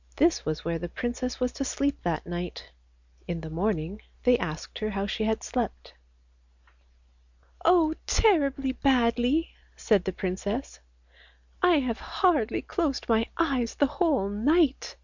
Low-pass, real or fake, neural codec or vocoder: 7.2 kHz; real; none